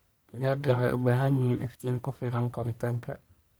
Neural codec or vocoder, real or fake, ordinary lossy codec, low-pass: codec, 44.1 kHz, 1.7 kbps, Pupu-Codec; fake; none; none